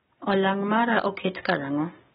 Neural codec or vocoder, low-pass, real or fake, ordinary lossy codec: none; 10.8 kHz; real; AAC, 16 kbps